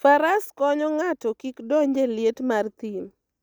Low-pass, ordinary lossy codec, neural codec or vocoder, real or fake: none; none; none; real